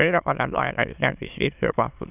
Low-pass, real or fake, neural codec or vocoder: 3.6 kHz; fake; autoencoder, 22.05 kHz, a latent of 192 numbers a frame, VITS, trained on many speakers